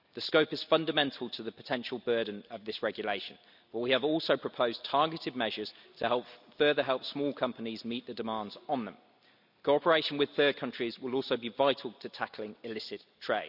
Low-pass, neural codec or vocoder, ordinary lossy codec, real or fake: 5.4 kHz; none; none; real